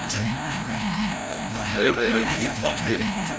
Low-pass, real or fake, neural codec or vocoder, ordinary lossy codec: none; fake; codec, 16 kHz, 0.5 kbps, FreqCodec, larger model; none